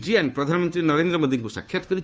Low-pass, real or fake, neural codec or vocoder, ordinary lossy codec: none; fake; codec, 16 kHz, 2 kbps, FunCodec, trained on Chinese and English, 25 frames a second; none